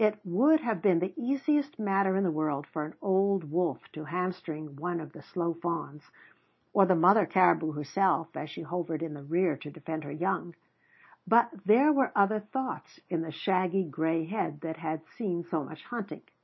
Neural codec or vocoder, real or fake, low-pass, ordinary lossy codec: none; real; 7.2 kHz; MP3, 24 kbps